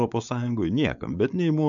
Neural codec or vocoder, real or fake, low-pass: codec, 16 kHz, 4.8 kbps, FACodec; fake; 7.2 kHz